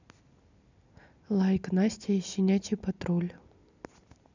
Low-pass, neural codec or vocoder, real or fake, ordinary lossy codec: 7.2 kHz; none; real; none